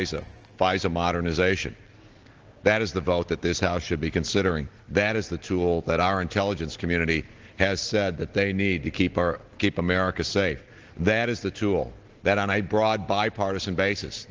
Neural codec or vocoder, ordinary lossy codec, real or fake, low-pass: none; Opus, 16 kbps; real; 7.2 kHz